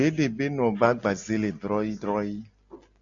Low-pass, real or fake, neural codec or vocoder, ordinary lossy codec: 7.2 kHz; real; none; AAC, 64 kbps